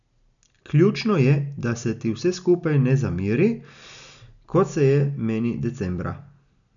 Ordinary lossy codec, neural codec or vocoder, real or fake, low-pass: none; none; real; 7.2 kHz